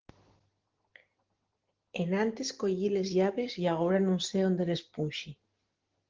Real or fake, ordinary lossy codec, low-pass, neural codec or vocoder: real; Opus, 16 kbps; 7.2 kHz; none